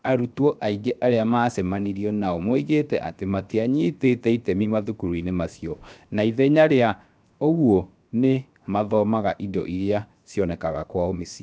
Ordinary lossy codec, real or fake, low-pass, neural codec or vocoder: none; fake; none; codec, 16 kHz, 0.7 kbps, FocalCodec